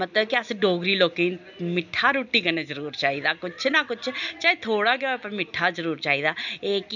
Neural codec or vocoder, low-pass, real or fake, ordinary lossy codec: none; 7.2 kHz; real; none